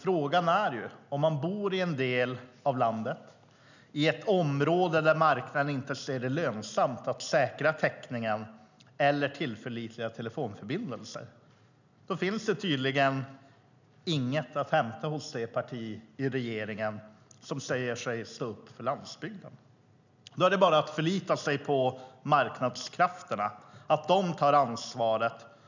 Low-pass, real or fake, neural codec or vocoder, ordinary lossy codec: 7.2 kHz; real; none; none